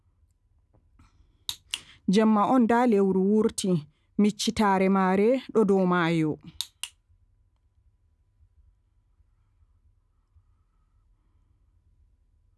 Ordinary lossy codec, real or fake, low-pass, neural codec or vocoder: none; real; none; none